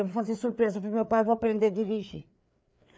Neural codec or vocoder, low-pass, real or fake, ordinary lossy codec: codec, 16 kHz, 4 kbps, FreqCodec, larger model; none; fake; none